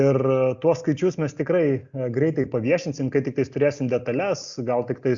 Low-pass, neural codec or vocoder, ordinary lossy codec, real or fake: 7.2 kHz; none; Opus, 64 kbps; real